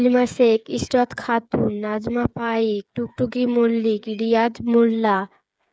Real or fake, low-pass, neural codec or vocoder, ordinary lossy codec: fake; none; codec, 16 kHz, 8 kbps, FreqCodec, smaller model; none